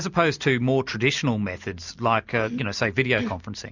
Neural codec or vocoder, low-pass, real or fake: none; 7.2 kHz; real